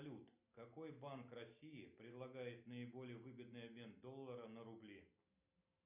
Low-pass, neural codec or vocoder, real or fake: 3.6 kHz; none; real